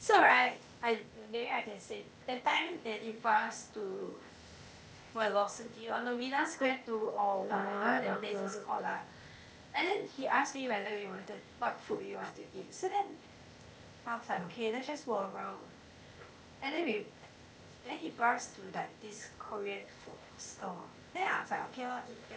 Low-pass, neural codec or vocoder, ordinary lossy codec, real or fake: none; codec, 16 kHz, 0.8 kbps, ZipCodec; none; fake